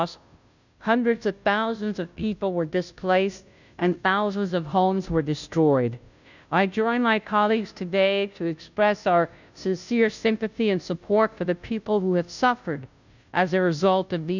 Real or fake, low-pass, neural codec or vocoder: fake; 7.2 kHz; codec, 16 kHz, 0.5 kbps, FunCodec, trained on Chinese and English, 25 frames a second